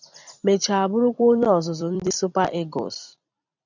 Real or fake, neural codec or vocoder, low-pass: fake; vocoder, 44.1 kHz, 128 mel bands every 256 samples, BigVGAN v2; 7.2 kHz